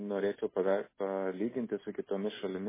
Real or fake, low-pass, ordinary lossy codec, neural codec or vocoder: real; 3.6 kHz; MP3, 16 kbps; none